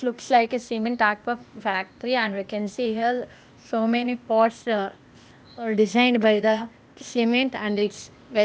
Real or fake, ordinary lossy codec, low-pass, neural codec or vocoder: fake; none; none; codec, 16 kHz, 0.8 kbps, ZipCodec